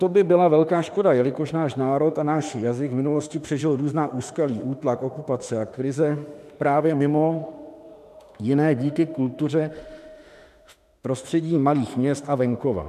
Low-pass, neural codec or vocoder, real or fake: 14.4 kHz; autoencoder, 48 kHz, 32 numbers a frame, DAC-VAE, trained on Japanese speech; fake